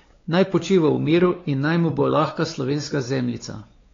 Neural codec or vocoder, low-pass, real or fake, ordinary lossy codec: codec, 16 kHz, 4 kbps, X-Codec, WavLM features, trained on Multilingual LibriSpeech; 7.2 kHz; fake; AAC, 32 kbps